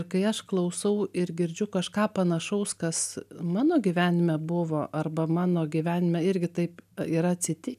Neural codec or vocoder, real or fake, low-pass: none; real; 14.4 kHz